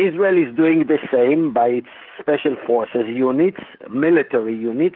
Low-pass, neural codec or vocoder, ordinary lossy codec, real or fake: 5.4 kHz; codec, 16 kHz, 16 kbps, FreqCodec, smaller model; Opus, 32 kbps; fake